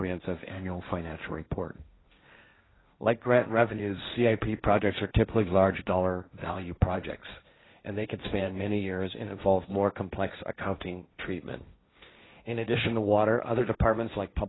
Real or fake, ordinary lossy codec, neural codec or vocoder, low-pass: fake; AAC, 16 kbps; codec, 16 kHz, 1.1 kbps, Voila-Tokenizer; 7.2 kHz